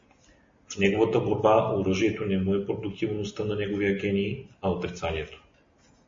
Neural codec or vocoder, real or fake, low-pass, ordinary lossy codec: none; real; 7.2 kHz; MP3, 32 kbps